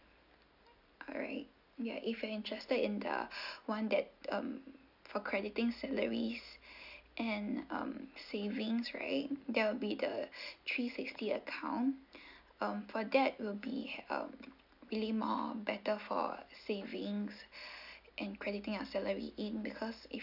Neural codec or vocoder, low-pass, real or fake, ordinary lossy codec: none; 5.4 kHz; real; none